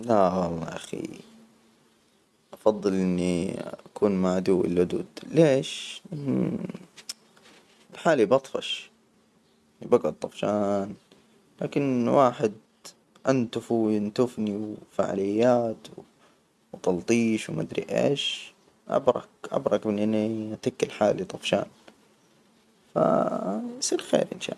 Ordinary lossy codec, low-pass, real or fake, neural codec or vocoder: none; none; real; none